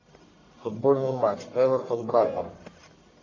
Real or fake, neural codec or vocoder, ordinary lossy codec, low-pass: fake; codec, 44.1 kHz, 1.7 kbps, Pupu-Codec; AAC, 32 kbps; 7.2 kHz